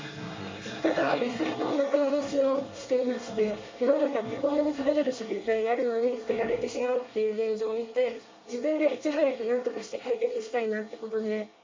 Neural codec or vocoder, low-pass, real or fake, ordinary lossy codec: codec, 24 kHz, 1 kbps, SNAC; 7.2 kHz; fake; MP3, 64 kbps